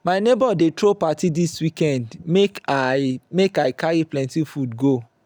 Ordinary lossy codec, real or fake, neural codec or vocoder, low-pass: none; real; none; none